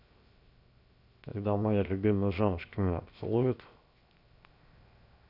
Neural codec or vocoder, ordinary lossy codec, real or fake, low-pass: codec, 16 kHz, 0.7 kbps, FocalCodec; none; fake; 5.4 kHz